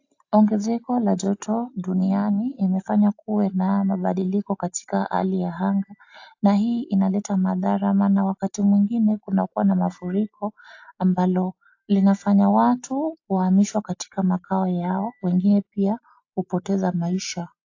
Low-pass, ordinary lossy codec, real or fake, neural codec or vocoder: 7.2 kHz; AAC, 48 kbps; real; none